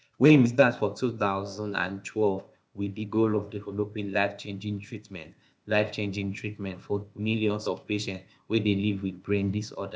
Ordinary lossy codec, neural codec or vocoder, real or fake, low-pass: none; codec, 16 kHz, 0.8 kbps, ZipCodec; fake; none